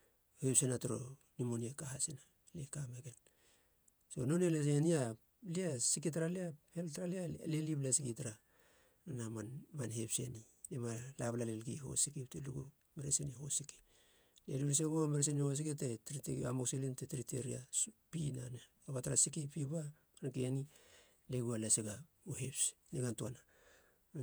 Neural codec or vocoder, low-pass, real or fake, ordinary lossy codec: vocoder, 48 kHz, 128 mel bands, Vocos; none; fake; none